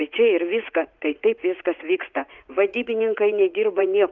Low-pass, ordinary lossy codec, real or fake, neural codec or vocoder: 7.2 kHz; Opus, 24 kbps; fake; vocoder, 44.1 kHz, 80 mel bands, Vocos